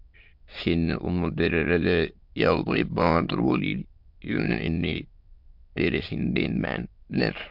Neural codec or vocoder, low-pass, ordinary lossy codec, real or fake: autoencoder, 22.05 kHz, a latent of 192 numbers a frame, VITS, trained on many speakers; 5.4 kHz; MP3, 48 kbps; fake